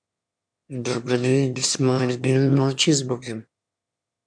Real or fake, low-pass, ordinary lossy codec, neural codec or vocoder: fake; 9.9 kHz; MP3, 96 kbps; autoencoder, 22.05 kHz, a latent of 192 numbers a frame, VITS, trained on one speaker